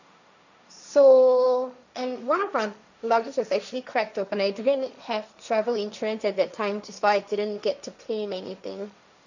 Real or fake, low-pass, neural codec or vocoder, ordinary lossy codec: fake; 7.2 kHz; codec, 16 kHz, 1.1 kbps, Voila-Tokenizer; none